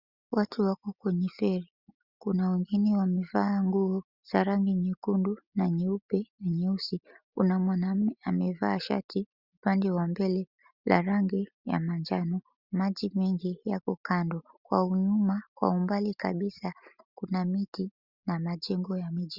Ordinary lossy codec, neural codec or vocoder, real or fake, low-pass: Opus, 64 kbps; none; real; 5.4 kHz